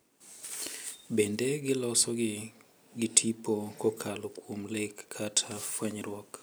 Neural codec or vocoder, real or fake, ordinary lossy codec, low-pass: none; real; none; none